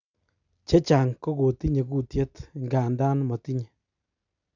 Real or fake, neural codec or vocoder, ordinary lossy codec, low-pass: real; none; none; 7.2 kHz